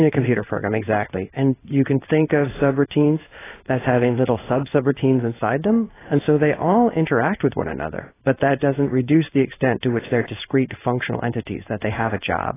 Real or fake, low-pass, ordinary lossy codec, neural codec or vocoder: fake; 3.6 kHz; AAC, 16 kbps; codec, 16 kHz in and 24 kHz out, 1 kbps, XY-Tokenizer